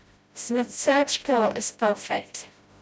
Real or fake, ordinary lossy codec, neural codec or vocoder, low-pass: fake; none; codec, 16 kHz, 0.5 kbps, FreqCodec, smaller model; none